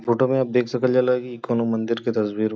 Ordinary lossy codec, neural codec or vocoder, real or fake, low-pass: none; none; real; none